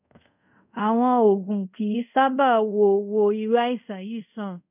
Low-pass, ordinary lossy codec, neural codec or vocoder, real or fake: 3.6 kHz; none; codec, 24 kHz, 0.5 kbps, DualCodec; fake